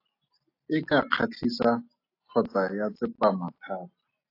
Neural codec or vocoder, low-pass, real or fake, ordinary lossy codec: none; 5.4 kHz; real; AAC, 32 kbps